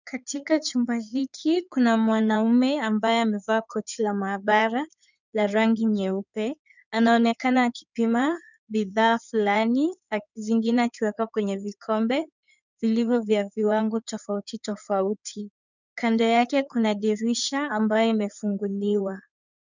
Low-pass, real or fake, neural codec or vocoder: 7.2 kHz; fake; codec, 16 kHz in and 24 kHz out, 2.2 kbps, FireRedTTS-2 codec